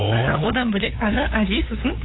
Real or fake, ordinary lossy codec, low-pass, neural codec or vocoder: fake; AAC, 16 kbps; 7.2 kHz; codec, 16 kHz, 8 kbps, FunCodec, trained on LibriTTS, 25 frames a second